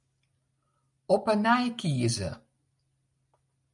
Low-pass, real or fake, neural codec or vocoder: 10.8 kHz; real; none